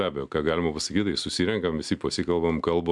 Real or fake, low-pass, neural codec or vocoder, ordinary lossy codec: real; 10.8 kHz; none; MP3, 96 kbps